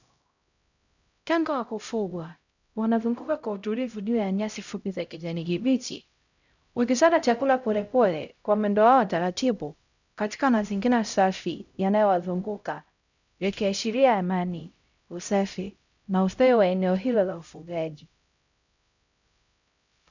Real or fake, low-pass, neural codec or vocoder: fake; 7.2 kHz; codec, 16 kHz, 0.5 kbps, X-Codec, HuBERT features, trained on LibriSpeech